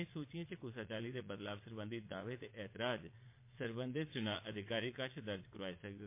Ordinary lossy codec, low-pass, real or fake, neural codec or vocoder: MP3, 24 kbps; 3.6 kHz; fake; vocoder, 22.05 kHz, 80 mel bands, Vocos